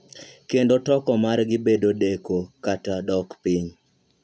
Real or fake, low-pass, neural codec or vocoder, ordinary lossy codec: real; none; none; none